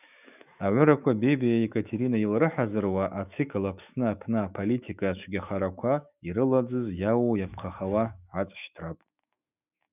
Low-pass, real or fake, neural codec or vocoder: 3.6 kHz; fake; autoencoder, 48 kHz, 128 numbers a frame, DAC-VAE, trained on Japanese speech